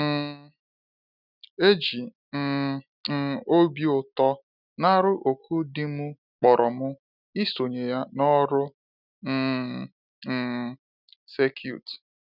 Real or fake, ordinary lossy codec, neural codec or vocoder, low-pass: real; none; none; 5.4 kHz